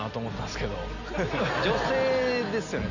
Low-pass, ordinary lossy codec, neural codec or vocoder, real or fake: 7.2 kHz; none; none; real